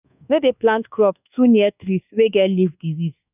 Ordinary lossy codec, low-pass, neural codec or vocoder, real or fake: none; 3.6 kHz; codec, 24 kHz, 1.2 kbps, DualCodec; fake